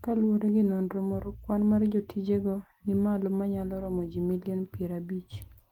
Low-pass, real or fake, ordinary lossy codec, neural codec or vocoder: 19.8 kHz; real; Opus, 24 kbps; none